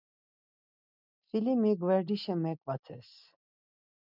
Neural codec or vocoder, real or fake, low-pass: none; real; 5.4 kHz